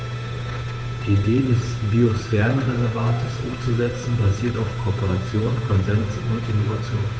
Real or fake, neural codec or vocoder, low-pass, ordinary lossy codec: fake; codec, 16 kHz, 8 kbps, FunCodec, trained on Chinese and English, 25 frames a second; none; none